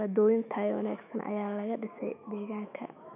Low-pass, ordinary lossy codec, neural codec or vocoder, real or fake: 3.6 kHz; none; autoencoder, 48 kHz, 128 numbers a frame, DAC-VAE, trained on Japanese speech; fake